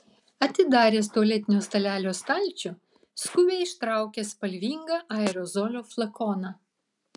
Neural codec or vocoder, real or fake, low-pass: none; real; 10.8 kHz